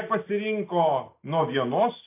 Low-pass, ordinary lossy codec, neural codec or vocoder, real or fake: 3.6 kHz; MP3, 24 kbps; none; real